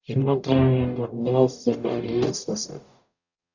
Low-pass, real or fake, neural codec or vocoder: 7.2 kHz; fake; codec, 44.1 kHz, 0.9 kbps, DAC